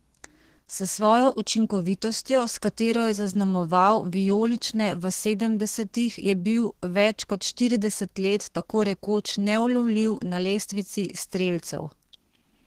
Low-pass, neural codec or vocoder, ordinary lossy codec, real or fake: 14.4 kHz; codec, 32 kHz, 1.9 kbps, SNAC; Opus, 16 kbps; fake